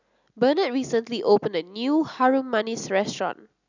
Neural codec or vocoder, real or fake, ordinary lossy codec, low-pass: none; real; none; 7.2 kHz